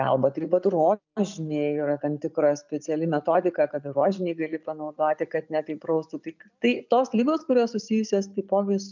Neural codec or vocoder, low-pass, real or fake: none; 7.2 kHz; real